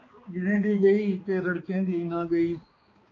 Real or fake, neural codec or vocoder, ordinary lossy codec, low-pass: fake; codec, 16 kHz, 2 kbps, X-Codec, HuBERT features, trained on balanced general audio; AAC, 32 kbps; 7.2 kHz